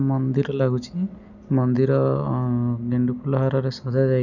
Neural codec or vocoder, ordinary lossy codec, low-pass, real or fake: none; none; 7.2 kHz; real